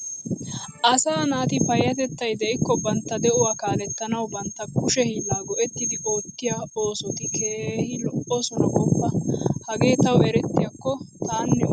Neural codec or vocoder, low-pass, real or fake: none; 9.9 kHz; real